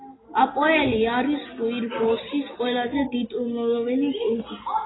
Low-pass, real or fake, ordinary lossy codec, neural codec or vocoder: 7.2 kHz; real; AAC, 16 kbps; none